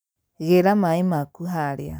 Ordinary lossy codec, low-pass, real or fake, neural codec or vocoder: none; none; real; none